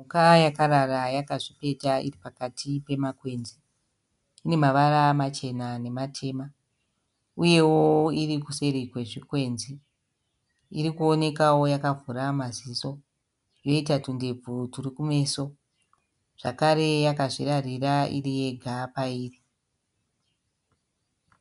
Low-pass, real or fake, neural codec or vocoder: 10.8 kHz; real; none